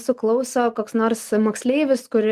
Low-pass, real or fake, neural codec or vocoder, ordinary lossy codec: 14.4 kHz; real; none; Opus, 24 kbps